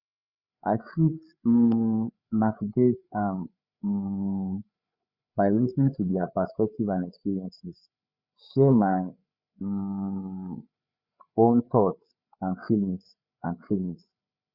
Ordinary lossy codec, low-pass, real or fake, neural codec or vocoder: Opus, 64 kbps; 5.4 kHz; fake; codec, 16 kHz, 8 kbps, FreqCodec, larger model